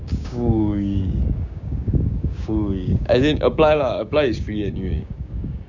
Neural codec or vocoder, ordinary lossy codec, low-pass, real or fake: codec, 16 kHz, 6 kbps, DAC; none; 7.2 kHz; fake